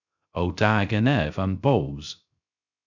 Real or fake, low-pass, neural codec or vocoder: fake; 7.2 kHz; codec, 16 kHz, 0.3 kbps, FocalCodec